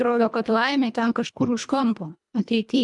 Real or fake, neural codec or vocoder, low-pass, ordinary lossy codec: fake; codec, 24 kHz, 1.5 kbps, HILCodec; 10.8 kHz; MP3, 96 kbps